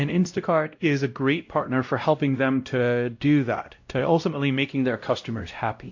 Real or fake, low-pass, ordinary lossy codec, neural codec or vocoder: fake; 7.2 kHz; AAC, 48 kbps; codec, 16 kHz, 0.5 kbps, X-Codec, WavLM features, trained on Multilingual LibriSpeech